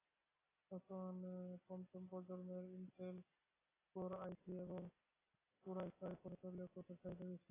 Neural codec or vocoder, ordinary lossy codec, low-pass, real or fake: none; AAC, 16 kbps; 3.6 kHz; real